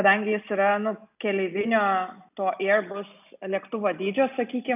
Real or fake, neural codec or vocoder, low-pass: real; none; 3.6 kHz